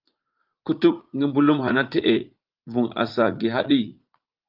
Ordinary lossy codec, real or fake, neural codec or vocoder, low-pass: Opus, 32 kbps; fake; vocoder, 44.1 kHz, 80 mel bands, Vocos; 5.4 kHz